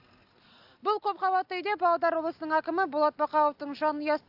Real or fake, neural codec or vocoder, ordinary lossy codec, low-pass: real; none; AAC, 48 kbps; 5.4 kHz